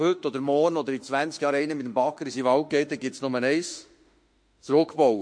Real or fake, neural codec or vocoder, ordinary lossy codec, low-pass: fake; autoencoder, 48 kHz, 32 numbers a frame, DAC-VAE, trained on Japanese speech; MP3, 48 kbps; 9.9 kHz